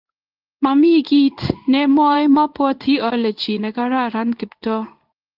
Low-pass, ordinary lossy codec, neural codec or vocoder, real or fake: 5.4 kHz; Opus, 24 kbps; codec, 16 kHz in and 24 kHz out, 1 kbps, XY-Tokenizer; fake